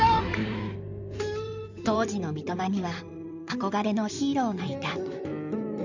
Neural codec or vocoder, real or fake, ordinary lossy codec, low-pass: codec, 16 kHz in and 24 kHz out, 2.2 kbps, FireRedTTS-2 codec; fake; none; 7.2 kHz